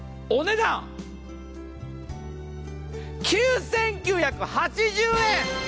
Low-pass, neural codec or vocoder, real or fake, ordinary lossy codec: none; none; real; none